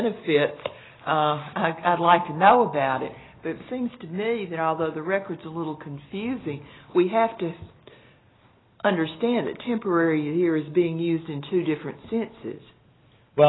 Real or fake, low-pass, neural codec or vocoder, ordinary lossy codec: real; 7.2 kHz; none; AAC, 16 kbps